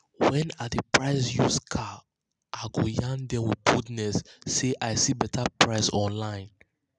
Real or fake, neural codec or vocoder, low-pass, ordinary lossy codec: real; none; 10.8 kHz; none